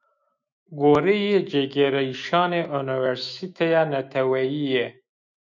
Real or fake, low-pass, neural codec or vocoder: fake; 7.2 kHz; autoencoder, 48 kHz, 128 numbers a frame, DAC-VAE, trained on Japanese speech